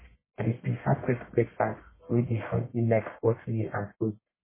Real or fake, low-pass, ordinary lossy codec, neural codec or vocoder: fake; 3.6 kHz; MP3, 16 kbps; codec, 44.1 kHz, 1.7 kbps, Pupu-Codec